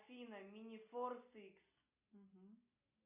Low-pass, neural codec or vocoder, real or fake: 3.6 kHz; none; real